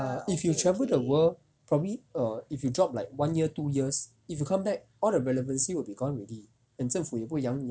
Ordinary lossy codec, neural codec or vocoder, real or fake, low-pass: none; none; real; none